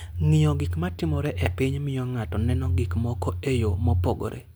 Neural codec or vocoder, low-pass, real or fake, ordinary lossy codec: none; none; real; none